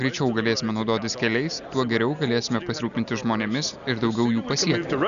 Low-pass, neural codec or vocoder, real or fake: 7.2 kHz; none; real